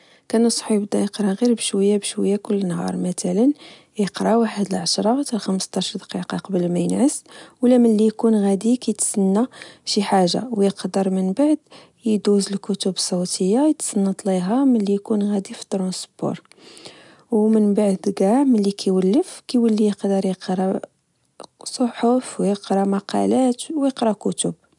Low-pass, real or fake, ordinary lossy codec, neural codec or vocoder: 10.8 kHz; real; none; none